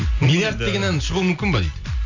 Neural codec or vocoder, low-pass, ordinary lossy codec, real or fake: none; 7.2 kHz; AAC, 48 kbps; real